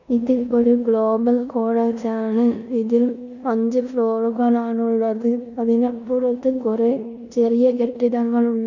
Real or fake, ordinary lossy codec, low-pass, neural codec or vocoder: fake; none; 7.2 kHz; codec, 16 kHz in and 24 kHz out, 0.9 kbps, LongCat-Audio-Codec, four codebook decoder